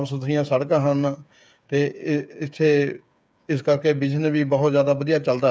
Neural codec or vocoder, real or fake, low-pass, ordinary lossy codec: codec, 16 kHz, 8 kbps, FreqCodec, smaller model; fake; none; none